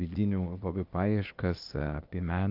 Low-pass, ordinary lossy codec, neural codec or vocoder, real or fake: 5.4 kHz; Opus, 24 kbps; codec, 16 kHz, 0.8 kbps, ZipCodec; fake